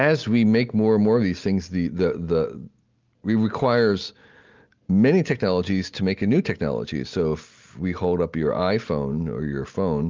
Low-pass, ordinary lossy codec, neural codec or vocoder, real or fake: 7.2 kHz; Opus, 32 kbps; none; real